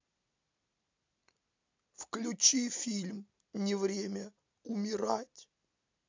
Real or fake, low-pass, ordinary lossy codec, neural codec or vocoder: real; 7.2 kHz; MP3, 64 kbps; none